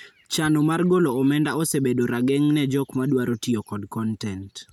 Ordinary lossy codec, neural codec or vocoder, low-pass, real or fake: none; none; 19.8 kHz; real